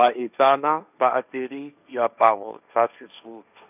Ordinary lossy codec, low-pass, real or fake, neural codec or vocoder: none; 3.6 kHz; fake; codec, 16 kHz, 1.1 kbps, Voila-Tokenizer